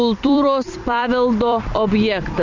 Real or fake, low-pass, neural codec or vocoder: fake; 7.2 kHz; vocoder, 44.1 kHz, 128 mel bands every 256 samples, BigVGAN v2